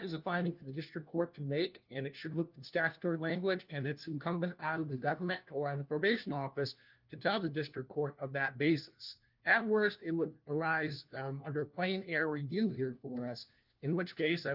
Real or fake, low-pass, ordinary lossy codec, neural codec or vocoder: fake; 5.4 kHz; Opus, 32 kbps; codec, 16 kHz, 1 kbps, FunCodec, trained on LibriTTS, 50 frames a second